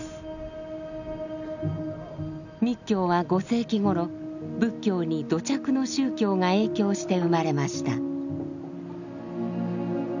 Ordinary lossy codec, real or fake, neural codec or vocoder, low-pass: none; real; none; 7.2 kHz